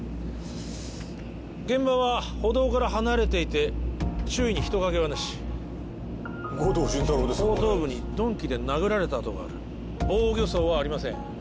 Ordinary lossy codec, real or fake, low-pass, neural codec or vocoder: none; real; none; none